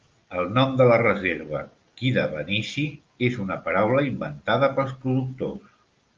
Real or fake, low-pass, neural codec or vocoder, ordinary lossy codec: real; 7.2 kHz; none; Opus, 24 kbps